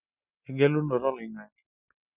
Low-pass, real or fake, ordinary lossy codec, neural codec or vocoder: 3.6 kHz; real; none; none